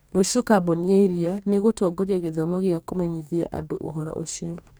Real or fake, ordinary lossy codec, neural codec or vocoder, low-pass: fake; none; codec, 44.1 kHz, 2.6 kbps, DAC; none